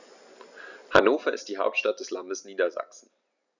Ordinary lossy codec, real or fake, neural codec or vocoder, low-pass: none; real; none; 7.2 kHz